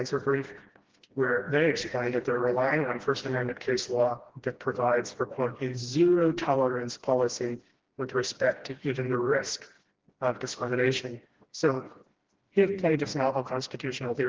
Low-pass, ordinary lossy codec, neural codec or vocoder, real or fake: 7.2 kHz; Opus, 16 kbps; codec, 16 kHz, 1 kbps, FreqCodec, smaller model; fake